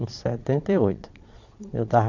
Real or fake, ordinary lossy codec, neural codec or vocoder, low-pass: fake; none; vocoder, 22.05 kHz, 80 mel bands, Vocos; 7.2 kHz